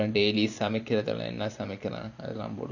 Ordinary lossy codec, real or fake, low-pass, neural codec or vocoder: none; fake; 7.2 kHz; vocoder, 44.1 kHz, 128 mel bands every 512 samples, BigVGAN v2